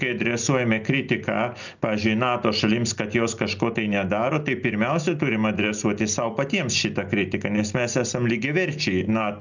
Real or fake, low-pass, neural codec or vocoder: real; 7.2 kHz; none